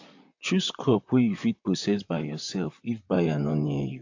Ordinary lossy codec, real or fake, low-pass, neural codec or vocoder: AAC, 48 kbps; fake; 7.2 kHz; vocoder, 44.1 kHz, 128 mel bands, Pupu-Vocoder